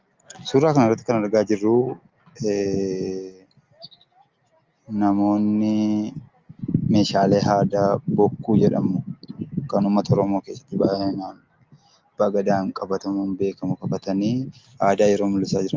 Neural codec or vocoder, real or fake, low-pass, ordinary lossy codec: none; real; 7.2 kHz; Opus, 32 kbps